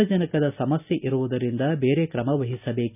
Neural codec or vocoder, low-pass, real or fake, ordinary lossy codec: none; 3.6 kHz; real; none